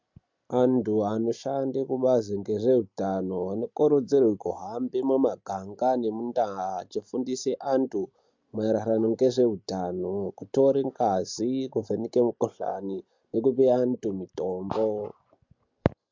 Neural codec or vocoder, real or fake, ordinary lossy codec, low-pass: none; real; MP3, 64 kbps; 7.2 kHz